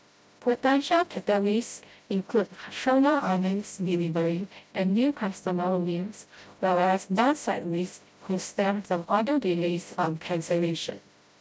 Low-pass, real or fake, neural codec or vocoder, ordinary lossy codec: none; fake; codec, 16 kHz, 0.5 kbps, FreqCodec, smaller model; none